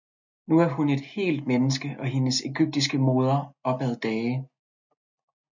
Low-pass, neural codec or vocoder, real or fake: 7.2 kHz; none; real